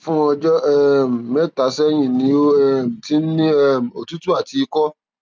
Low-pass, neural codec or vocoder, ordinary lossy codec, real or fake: none; none; none; real